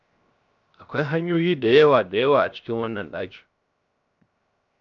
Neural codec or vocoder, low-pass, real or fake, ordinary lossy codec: codec, 16 kHz, 0.7 kbps, FocalCodec; 7.2 kHz; fake; MP3, 64 kbps